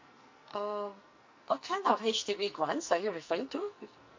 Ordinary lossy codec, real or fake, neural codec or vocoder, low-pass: MP3, 48 kbps; fake; codec, 44.1 kHz, 2.6 kbps, SNAC; 7.2 kHz